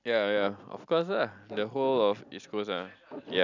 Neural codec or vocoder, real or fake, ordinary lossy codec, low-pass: none; real; none; 7.2 kHz